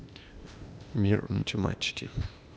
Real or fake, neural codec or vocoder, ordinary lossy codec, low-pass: fake; codec, 16 kHz, 0.8 kbps, ZipCodec; none; none